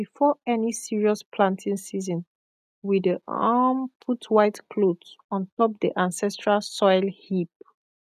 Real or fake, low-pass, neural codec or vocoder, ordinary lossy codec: real; 14.4 kHz; none; none